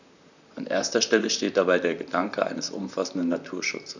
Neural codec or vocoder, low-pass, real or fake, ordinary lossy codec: vocoder, 44.1 kHz, 128 mel bands, Pupu-Vocoder; 7.2 kHz; fake; none